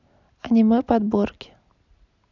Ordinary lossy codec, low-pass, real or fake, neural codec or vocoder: none; 7.2 kHz; real; none